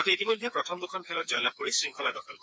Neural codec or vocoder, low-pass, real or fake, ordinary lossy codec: codec, 16 kHz, 4 kbps, FreqCodec, smaller model; none; fake; none